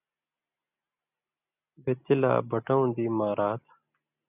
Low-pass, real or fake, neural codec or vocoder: 3.6 kHz; real; none